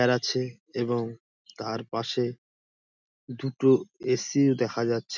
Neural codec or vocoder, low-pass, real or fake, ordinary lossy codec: none; 7.2 kHz; real; none